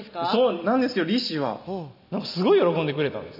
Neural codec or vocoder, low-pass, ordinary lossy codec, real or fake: none; 5.4 kHz; none; real